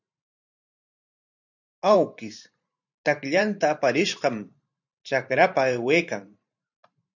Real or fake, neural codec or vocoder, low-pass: fake; vocoder, 44.1 kHz, 128 mel bands every 512 samples, BigVGAN v2; 7.2 kHz